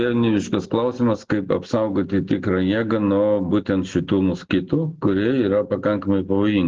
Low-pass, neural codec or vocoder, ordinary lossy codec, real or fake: 7.2 kHz; none; Opus, 16 kbps; real